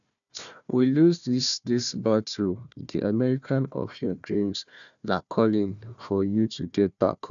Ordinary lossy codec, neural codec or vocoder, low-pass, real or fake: none; codec, 16 kHz, 1 kbps, FunCodec, trained on Chinese and English, 50 frames a second; 7.2 kHz; fake